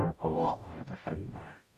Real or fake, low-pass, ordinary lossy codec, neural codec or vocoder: fake; 14.4 kHz; none; codec, 44.1 kHz, 0.9 kbps, DAC